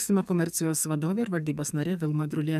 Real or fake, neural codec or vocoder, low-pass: fake; codec, 44.1 kHz, 2.6 kbps, SNAC; 14.4 kHz